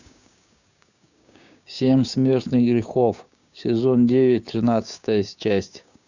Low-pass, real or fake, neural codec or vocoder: 7.2 kHz; fake; codec, 16 kHz, 6 kbps, DAC